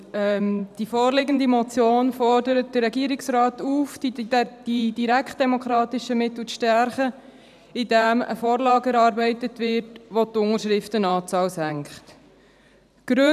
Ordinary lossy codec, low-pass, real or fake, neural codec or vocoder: none; 14.4 kHz; fake; vocoder, 44.1 kHz, 128 mel bands every 512 samples, BigVGAN v2